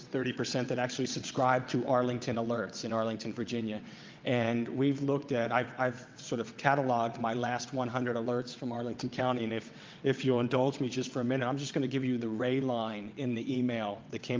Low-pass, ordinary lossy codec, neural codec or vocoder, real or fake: 7.2 kHz; Opus, 32 kbps; vocoder, 22.05 kHz, 80 mel bands, WaveNeXt; fake